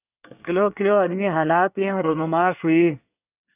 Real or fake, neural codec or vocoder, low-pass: fake; codec, 24 kHz, 1 kbps, SNAC; 3.6 kHz